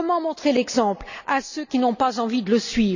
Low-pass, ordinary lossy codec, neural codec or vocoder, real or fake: 7.2 kHz; none; none; real